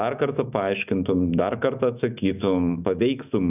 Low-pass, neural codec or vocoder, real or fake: 3.6 kHz; autoencoder, 48 kHz, 128 numbers a frame, DAC-VAE, trained on Japanese speech; fake